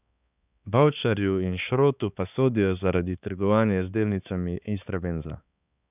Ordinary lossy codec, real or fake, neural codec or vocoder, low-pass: none; fake; codec, 16 kHz, 4 kbps, X-Codec, HuBERT features, trained on balanced general audio; 3.6 kHz